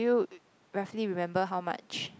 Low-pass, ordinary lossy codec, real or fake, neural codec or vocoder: none; none; real; none